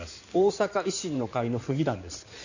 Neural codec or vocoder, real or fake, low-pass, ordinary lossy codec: codec, 16 kHz in and 24 kHz out, 2.2 kbps, FireRedTTS-2 codec; fake; 7.2 kHz; none